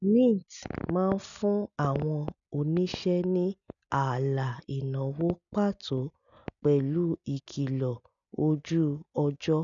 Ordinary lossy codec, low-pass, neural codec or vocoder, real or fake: none; 7.2 kHz; none; real